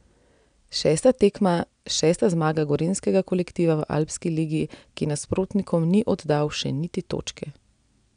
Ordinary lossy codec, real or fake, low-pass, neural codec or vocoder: none; real; 9.9 kHz; none